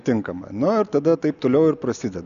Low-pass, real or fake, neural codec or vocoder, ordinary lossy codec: 7.2 kHz; real; none; AAC, 96 kbps